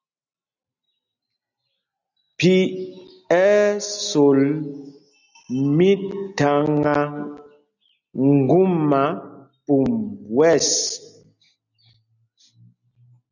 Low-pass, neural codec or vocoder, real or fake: 7.2 kHz; none; real